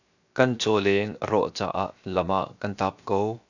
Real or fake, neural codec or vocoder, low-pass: fake; codec, 16 kHz, 0.7 kbps, FocalCodec; 7.2 kHz